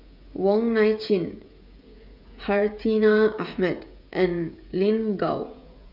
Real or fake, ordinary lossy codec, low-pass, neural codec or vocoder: fake; none; 5.4 kHz; codec, 16 kHz, 16 kbps, FreqCodec, smaller model